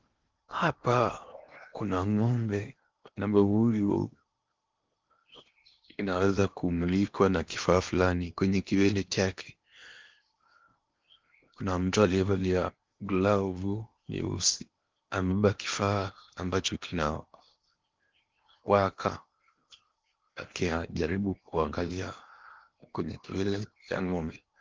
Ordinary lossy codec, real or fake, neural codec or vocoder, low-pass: Opus, 32 kbps; fake; codec, 16 kHz in and 24 kHz out, 0.8 kbps, FocalCodec, streaming, 65536 codes; 7.2 kHz